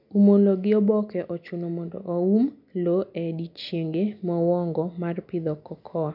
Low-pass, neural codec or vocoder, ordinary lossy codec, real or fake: 5.4 kHz; none; none; real